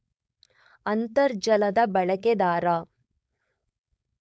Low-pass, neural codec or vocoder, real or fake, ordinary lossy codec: none; codec, 16 kHz, 4.8 kbps, FACodec; fake; none